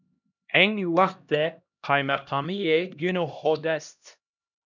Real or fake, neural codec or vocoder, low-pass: fake; codec, 16 kHz, 1 kbps, X-Codec, HuBERT features, trained on LibriSpeech; 7.2 kHz